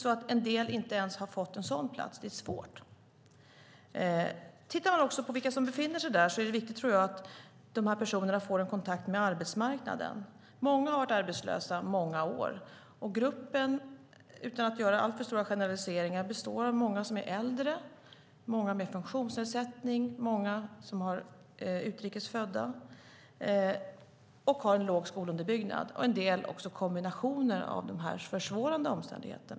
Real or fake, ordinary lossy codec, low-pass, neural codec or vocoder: real; none; none; none